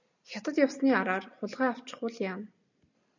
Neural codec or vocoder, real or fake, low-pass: none; real; 7.2 kHz